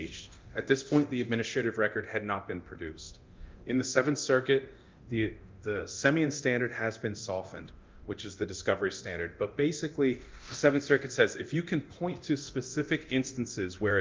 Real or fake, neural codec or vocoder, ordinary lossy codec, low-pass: fake; codec, 24 kHz, 0.9 kbps, DualCodec; Opus, 24 kbps; 7.2 kHz